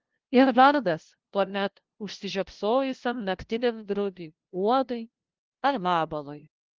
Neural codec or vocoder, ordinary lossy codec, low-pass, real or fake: codec, 16 kHz, 0.5 kbps, FunCodec, trained on LibriTTS, 25 frames a second; Opus, 16 kbps; 7.2 kHz; fake